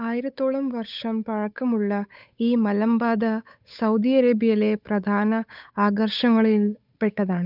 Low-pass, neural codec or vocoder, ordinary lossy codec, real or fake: 5.4 kHz; codec, 16 kHz, 8 kbps, FunCodec, trained on Chinese and English, 25 frames a second; none; fake